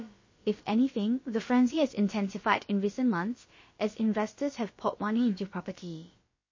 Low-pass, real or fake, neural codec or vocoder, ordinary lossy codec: 7.2 kHz; fake; codec, 16 kHz, about 1 kbps, DyCAST, with the encoder's durations; MP3, 32 kbps